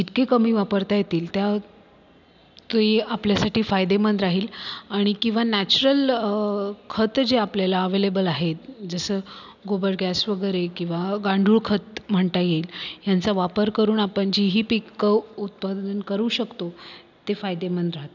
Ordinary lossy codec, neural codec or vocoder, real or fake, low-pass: none; none; real; 7.2 kHz